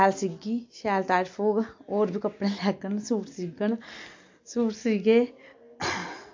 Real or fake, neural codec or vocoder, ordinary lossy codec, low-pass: real; none; MP3, 48 kbps; 7.2 kHz